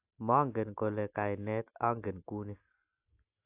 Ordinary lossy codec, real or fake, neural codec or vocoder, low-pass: AAC, 24 kbps; real; none; 3.6 kHz